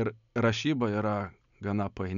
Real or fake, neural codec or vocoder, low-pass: real; none; 7.2 kHz